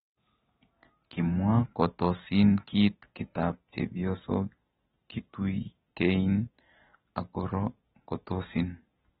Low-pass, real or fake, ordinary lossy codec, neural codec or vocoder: 19.8 kHz; real; AAC, 16 kbps; none